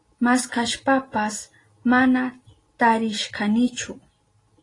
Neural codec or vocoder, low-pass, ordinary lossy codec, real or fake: none; 10.8 kHz; AAC, 32 kbps; real